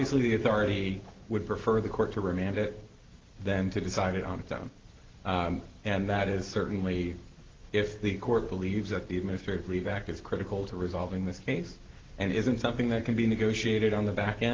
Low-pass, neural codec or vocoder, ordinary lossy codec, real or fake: 7.2 kHz; none; Opus, 16 kbps; real